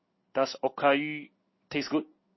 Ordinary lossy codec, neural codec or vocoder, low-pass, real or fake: MP3, 24 kbps; codec, 16 kHz in and 24 kHz out, 1 kbps, XY-Tokenizer; 7.2 kHz; fake